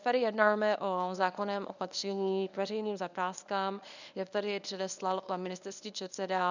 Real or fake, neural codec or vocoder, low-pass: fake; codec, 24 kHz, 0.9 kbps, WavTokenizer, medium speech release version 1; 7.2 kHz